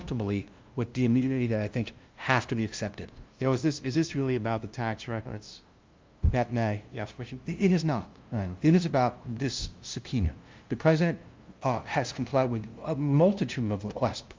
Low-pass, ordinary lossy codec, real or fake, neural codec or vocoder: 7.2 kHz; Opus, 24 kbps; fake; codec, 16 kHz, 0.5 kbps, FunCodec, trained on LibriTTS, 25 frames a second